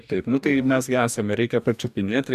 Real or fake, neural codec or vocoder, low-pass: fake; codec, 44.1 kHz, 2.6 kbps, DAC; 14.4 kHz